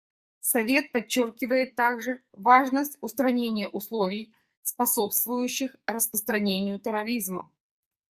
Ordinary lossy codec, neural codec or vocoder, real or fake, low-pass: Opus, 64 kbps; codec, 44.1 kHz, 2.6 kbps, SNAC; fake; 14.4 kHz